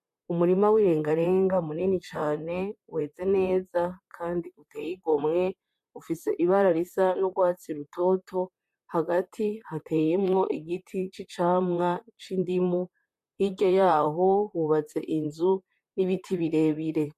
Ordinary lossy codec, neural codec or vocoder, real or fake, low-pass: MP3, 64 kbps; vocoder, 44.1 kHz, 128 mel bands, Pupu-Vocoder; fake; 14.4 kHz